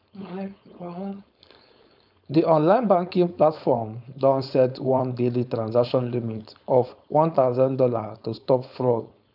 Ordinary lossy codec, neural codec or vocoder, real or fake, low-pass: none; codec, 16 kHz, 4.8 kbps, FACodec; fake; 5.4 kHz